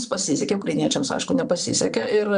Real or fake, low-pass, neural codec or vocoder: fake; 9.9 kHz; vocoder, 22.05 kHz, 80 mel bands, Vocos